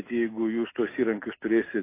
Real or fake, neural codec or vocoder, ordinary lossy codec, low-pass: real; none; AAC, 24 kbps; 3.6 kHz